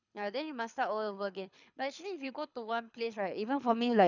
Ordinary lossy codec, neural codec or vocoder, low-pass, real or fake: none; codec, 24 kHz, 6 kbps, HILCodec; 7.2 kHz; fake